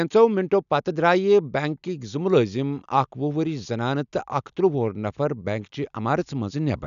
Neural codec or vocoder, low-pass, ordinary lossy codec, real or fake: none; 7.2 kHz; none; real